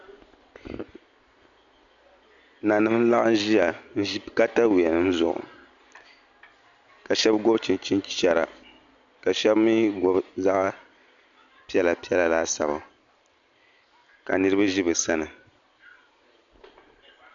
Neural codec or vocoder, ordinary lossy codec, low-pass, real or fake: none; MP3, 64 kbps; 7.2 kHz; real